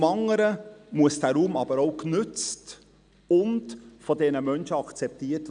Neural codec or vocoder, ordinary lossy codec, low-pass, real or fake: none; none; 9.9 kHz; real